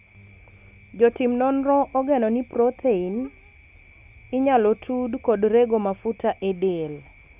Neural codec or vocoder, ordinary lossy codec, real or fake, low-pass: none; none; real; 3.6 kHz